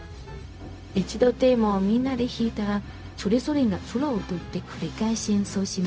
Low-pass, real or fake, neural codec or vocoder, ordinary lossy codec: none; fake; codec, 16 kHz, 0.4 kbps, LongCat-Audio-Codec; none